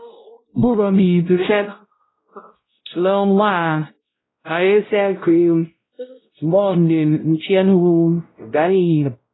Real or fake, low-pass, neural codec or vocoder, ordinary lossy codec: fake; 7.2 kHz; codec, 16 kHz, 0.5 kbps, X-Codec, WavLM features, trained on Multilingual LibriSpeech; AAC, 16 kbps